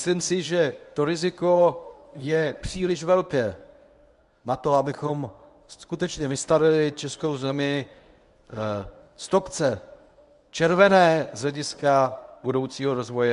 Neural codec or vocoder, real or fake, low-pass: codec, 24 kHz, 0.9 kbps, WavTokenizer, medium speech release version 1; fake; 10.8 kHz